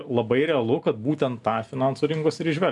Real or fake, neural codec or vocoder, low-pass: real; none; 10.8 kHz